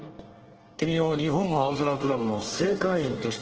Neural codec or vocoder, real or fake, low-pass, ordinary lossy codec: codec, 24 kHz, 1 kbps, SNAC; fake; 7.2 kHz; Opus, 16 kbps